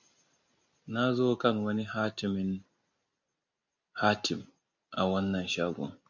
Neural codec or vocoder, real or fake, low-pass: none; real; 7.2 kHz